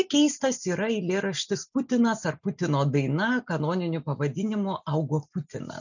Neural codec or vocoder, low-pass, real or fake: none; 7.2 kHz; real